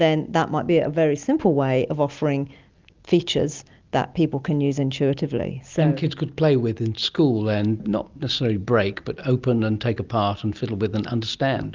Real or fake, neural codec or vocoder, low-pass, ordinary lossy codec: real; none; 7.2 kHz; Opus, 32 kbps